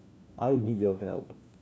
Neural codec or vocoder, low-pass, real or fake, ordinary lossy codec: codec, 16 kHz, 1 kbps, FunCodec, trained on LibriTTS, 50 frames a second; none; fake; none